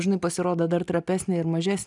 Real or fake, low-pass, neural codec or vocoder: real; 10.8 kHz; none